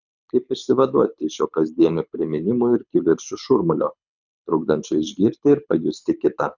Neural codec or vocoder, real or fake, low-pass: codec, 24 kHz, 6 kbps, HILCodec; fake; 7.2 kHz